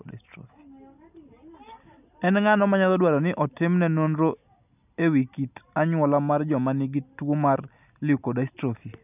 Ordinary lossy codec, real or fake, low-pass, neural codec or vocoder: none; real; 3.6 kHz; none